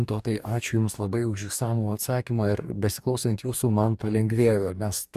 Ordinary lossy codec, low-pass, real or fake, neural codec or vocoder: Opus, 64 kbps; 14.4 kHz; fake; codec, 44.1 kHz, 2.6 kbps, DAC